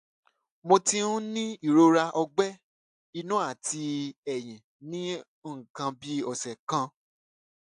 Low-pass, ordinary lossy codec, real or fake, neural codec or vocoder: 10.8 kHz; AAC, 64 kbps; real; none